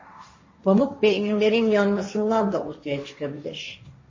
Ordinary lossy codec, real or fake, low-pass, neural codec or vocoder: MP3, 32 kbps; fake; 7.2 kHz; codec, 16 kHz, 1.1 kbps, Voila-Tokenizer